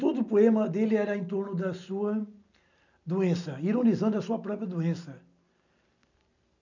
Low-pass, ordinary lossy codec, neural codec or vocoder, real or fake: 7.2 kHz; none; none; real